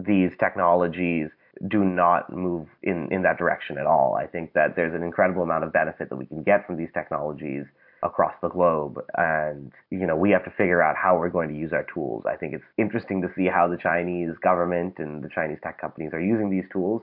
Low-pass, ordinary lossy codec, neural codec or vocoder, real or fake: 5.4 kHz; MP3, 48 kbps; none; real